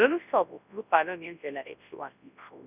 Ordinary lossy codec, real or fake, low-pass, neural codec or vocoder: none; fake; 3.6 kHz; codec, 24 kHz, 0.9 kbps, WavTokenizer, large speech release